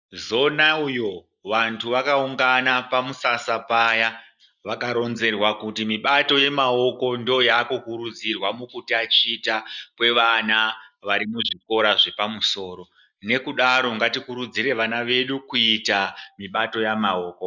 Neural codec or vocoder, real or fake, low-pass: none; real; 7.2 kHz